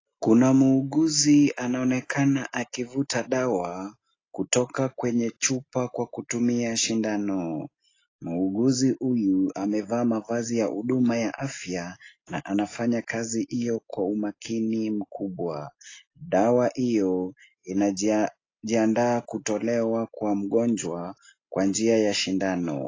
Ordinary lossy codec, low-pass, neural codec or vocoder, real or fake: AAC, 32 kbps; 7.2 kHz; none; real